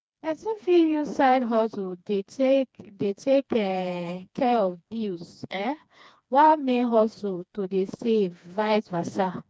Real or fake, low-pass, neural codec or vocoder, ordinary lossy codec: fake; none; codec, 16 kHz, 2 kbps, FreqCodec, smaller model; none